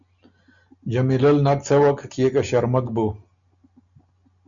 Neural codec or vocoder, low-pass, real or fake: none; 7.2 kHz; real